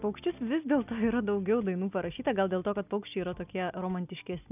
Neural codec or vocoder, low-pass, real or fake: none; 3.6 kHz; real